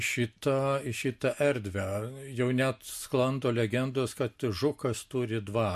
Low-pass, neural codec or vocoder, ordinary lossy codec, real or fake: 14.4 kHz; none; MP3, 64 kbps; real